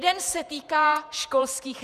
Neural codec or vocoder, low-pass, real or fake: vocoder, 48 kHz, 128 mel bands, Vocos; 14.4 kHz; fake